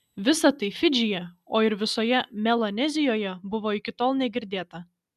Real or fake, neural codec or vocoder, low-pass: real; none; 14.4 kHz